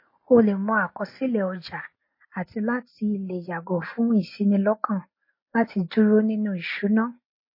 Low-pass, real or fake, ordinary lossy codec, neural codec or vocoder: 5.4 kHz; real; MP3, 24 kbps; none